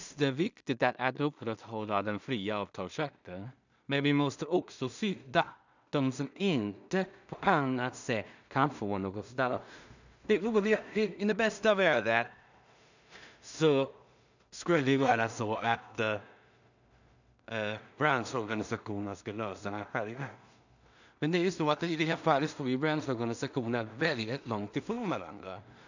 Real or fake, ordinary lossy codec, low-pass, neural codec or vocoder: fake; none; 7.2 kHz; codec, 16 kHz in and 24 kHz out, 0.4 kbps, LongCat-Audio-Codec, two codebook decoder